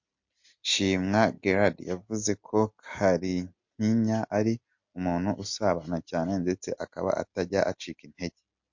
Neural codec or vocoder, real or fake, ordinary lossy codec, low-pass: none; real; MP3, 48 kbps; 7.2 kHz